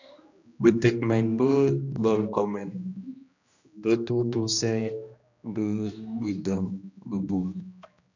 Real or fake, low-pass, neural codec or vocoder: fake; 7.2 kHz; codec, 16 kHz, 1 kbps, X-Codec, HuBERT features, trained on general audio